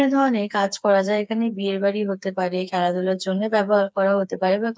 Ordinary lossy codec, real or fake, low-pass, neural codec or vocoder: none; fake; none; codec, 16 kHz, 4 kbps, FreqCodec, smaller model